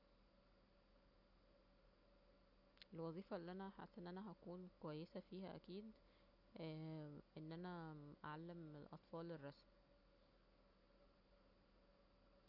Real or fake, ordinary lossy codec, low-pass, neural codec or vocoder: real; none; 5.4 kHz; none